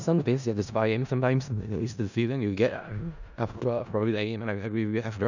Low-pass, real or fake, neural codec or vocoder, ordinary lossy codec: 7.2 kHz; fake; codec, 16 kHz in and 24 kHz out, 0.4 kbps, LongCat-Audio-Codec, four codebook decoder; none